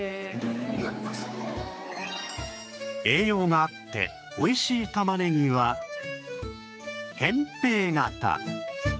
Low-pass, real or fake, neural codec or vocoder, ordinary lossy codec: none; fake; codec, 16 kHz, 4 kbps, X-Codec, HuBERT features, trained on general audio; none